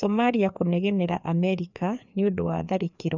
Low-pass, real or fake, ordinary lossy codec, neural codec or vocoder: 7.2 kHz; fake; none; codec, 16 kHz, 2 kbps, FreqCodec, larger model